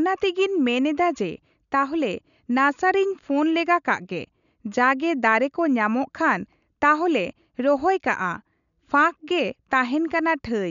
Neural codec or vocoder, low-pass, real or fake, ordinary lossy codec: none; 7.2 kHz; real; none